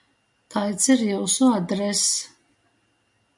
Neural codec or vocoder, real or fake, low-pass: none; real; 10.8 kHz